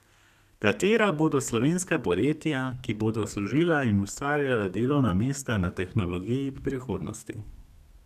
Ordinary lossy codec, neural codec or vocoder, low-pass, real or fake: none; codec, 32 kHz, 1.9 kbps, SNAC; 14.4 kHz; fake